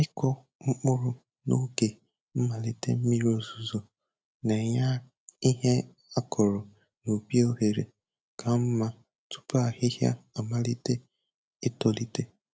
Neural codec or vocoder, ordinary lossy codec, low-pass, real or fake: none; none; none; real